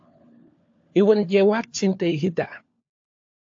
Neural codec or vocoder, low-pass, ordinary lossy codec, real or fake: codec, 16 kHz, 4 kbps, FunCodec, trained on LibriTTS, 50 frames a second; 7.2 kHz; AAC, 48 kbps; fake